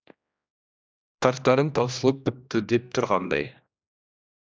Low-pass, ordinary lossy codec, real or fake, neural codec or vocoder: none; none; fake; codec, 16 kHz, 1 kbps, X-Codec, HuBERT features, trained on general audio